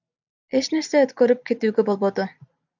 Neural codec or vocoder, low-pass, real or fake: none; 7.2 kHz; real